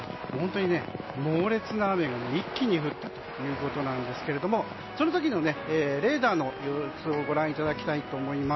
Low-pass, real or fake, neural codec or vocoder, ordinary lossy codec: 7.2 kHz; real; none; MP3, 24 kbps